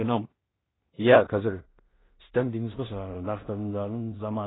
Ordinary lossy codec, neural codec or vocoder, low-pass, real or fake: AAC, 16 kbps; codec, 16 kHz in and 24 kHz out, 0.4 kbps, LongCat-Audio-Codec, two codebook decoder; 7.2 kHz; fake